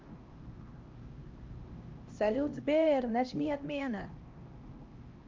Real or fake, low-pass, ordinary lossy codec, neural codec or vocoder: fake; 7.2 kHz; Opus, 24 kbps; codec, 16 kHz, 1 kbps, X-Codec, HuBERT features, trained on LibriSpeech